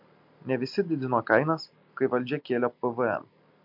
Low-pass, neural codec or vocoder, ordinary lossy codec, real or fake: 5.4 kHz; none; MP3, 48 kbps; real